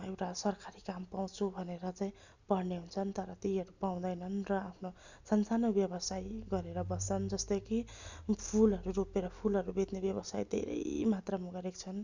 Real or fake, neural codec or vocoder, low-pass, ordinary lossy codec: real; none; 7.2 kHz; none